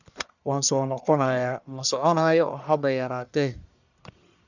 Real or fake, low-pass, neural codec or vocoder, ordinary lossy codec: fake; 7.2 kHz; codec, 44.1 kHz, 1.7 kbps, Pupu-Codec; none